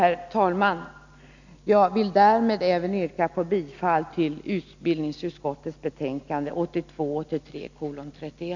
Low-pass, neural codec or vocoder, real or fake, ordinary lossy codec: 7.2 kHz; none; real; none